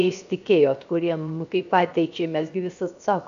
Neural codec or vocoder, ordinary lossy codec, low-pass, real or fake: codec, 16 kHz, 0.7 kbps, FocalCodec; AAC, 96 kbps; 7.2 kHz; fake